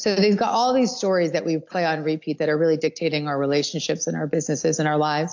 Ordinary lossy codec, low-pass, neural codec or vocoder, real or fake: AAC, 48 kbps; 7.2 kHz; none; real